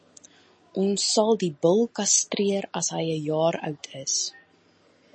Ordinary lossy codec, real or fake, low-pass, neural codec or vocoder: MP3, 32 kbps; real; 9.9 kHz; none